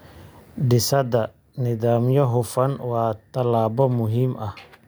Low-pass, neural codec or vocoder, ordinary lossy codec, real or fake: none; none; none; real